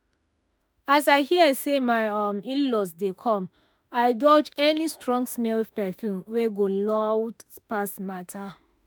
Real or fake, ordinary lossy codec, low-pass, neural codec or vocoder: fake; none; none; autoencoder, 48 kHz, 32 numbers a frame, DAC-VAE, trained on Japanese speech